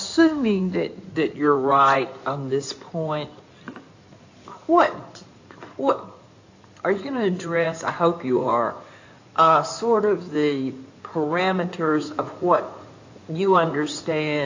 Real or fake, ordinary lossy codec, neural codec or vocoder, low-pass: fake; AAC, 48 kbps; codec, 16 kHz in and 24 kHz out, 2.2 kbps, FireRedTTS-2 codec; 7.2 kHz